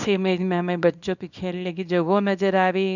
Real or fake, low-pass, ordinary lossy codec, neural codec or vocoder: fake; 7.2 kHz; none; codec, 24 kHz, 0.9 kbps, WavTokenizer, small release